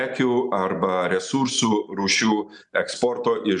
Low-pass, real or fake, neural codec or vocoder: 10.8 kHz; real; none